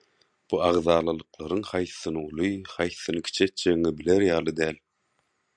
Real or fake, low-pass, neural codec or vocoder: real; 9.9 kHz; none